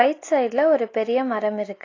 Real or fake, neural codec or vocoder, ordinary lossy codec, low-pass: real; none; AAC, 32 kbps; 7.2 kHz